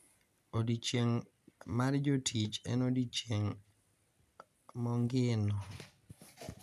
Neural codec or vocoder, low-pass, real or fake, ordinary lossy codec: none; 14.4 kHz; real; none